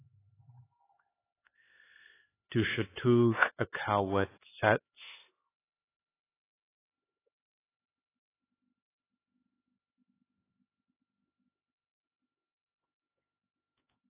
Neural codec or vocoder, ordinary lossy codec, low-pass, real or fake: codec, 16 kHz, 4 kbps, X-Codec, HuBERT features, trained on LibriSpeech; AAC, 16 kbps; 3.6 kHz; fake